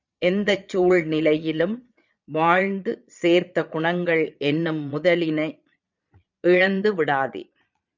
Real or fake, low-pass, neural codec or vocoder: fake; 7.2 kHz; vocoder, 22.05 kHz, 80 mel bands, Vocos